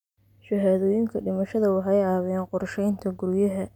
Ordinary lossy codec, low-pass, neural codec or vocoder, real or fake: none; 19.8 kHz; none; real